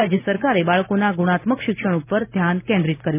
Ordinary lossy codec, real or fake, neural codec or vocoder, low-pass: none; real; none; 3.6 kHz